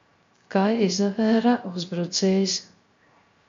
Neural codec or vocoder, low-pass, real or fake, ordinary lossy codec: codec, 16 kHz, 0.3 kbps, FocalCodec; 7.2 kHz; fake; MP3, 48 kbps